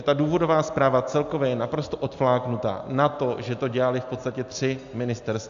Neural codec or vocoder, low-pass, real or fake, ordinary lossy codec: none; 7.2 kHz; real; MP3, 64 kbps